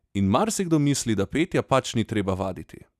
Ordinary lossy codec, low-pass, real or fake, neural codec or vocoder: none; 14.4 kHz; real; none